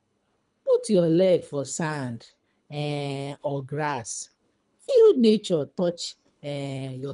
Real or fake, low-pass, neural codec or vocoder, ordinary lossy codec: fake; 10.8 kHz; codec, 24 kHz, 3 kbps, HILCodec; none